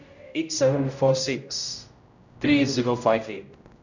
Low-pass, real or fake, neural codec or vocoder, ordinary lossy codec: 7.2 kHz; fake; codec, 16 kHz, 0.5 kbps, X-Codec, HuBERT features, trained on general audio; none